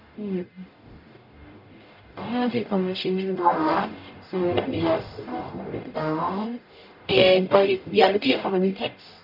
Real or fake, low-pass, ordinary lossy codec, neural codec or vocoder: fake; 5.4 kHz; none; codec, 44.1 kHz, 0.9 kbps, DAC